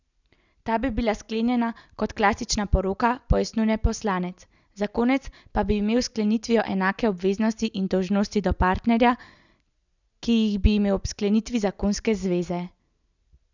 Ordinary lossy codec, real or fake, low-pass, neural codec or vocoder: none; real; 7.2 kHz; none